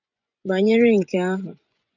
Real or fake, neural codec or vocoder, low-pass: fake; vocoder, 24 kHz, 100 mel bands, Vocos; 7.2 kHz